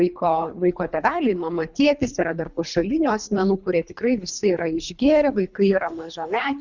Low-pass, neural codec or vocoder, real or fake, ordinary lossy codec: 7.2 kHz; codec, 24 kHz, 3 kbps, HILCodec; fake; Opus, 64 kbps